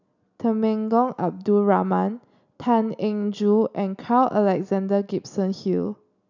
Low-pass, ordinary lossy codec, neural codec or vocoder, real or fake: 7.2 kHz; none; none; real